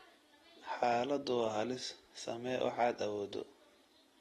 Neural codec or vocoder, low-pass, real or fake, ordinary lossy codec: none; 19.8 kHz; real; AAC, 32 kbps